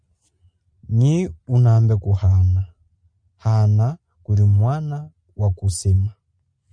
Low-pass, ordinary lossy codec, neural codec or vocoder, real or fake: 9.9 kHz; MP3, 96 kbps; none; real